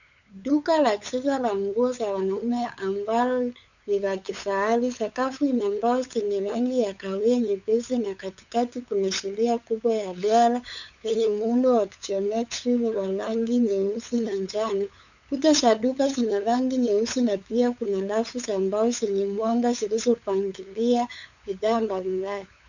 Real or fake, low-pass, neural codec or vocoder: fake; 7.2 kHz; codec, 16 kHz, 8 kbps, FunCodec, trained on LibriTTS, 25 frames a second